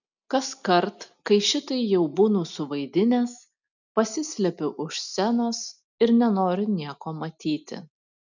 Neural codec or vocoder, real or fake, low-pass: none; real; 7.2 kHz